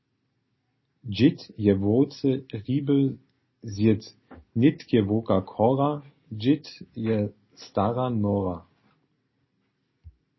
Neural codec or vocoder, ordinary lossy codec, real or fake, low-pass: none; MP3, 24 kbps; real; 7.2 kHz